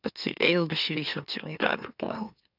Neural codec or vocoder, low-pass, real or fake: autoencoder, 44.1 kHz, a latent of 192 numbers a frame, MeloTTS; 5.4 kHz; fake